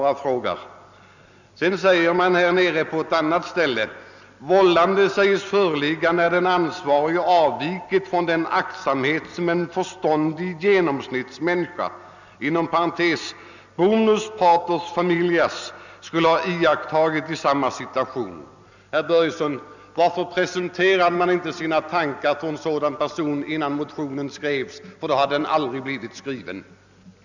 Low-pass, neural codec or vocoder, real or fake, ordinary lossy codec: 7.2 kHz; none; real; none